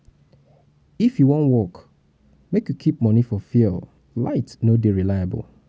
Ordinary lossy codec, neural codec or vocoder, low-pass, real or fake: none; none; none; real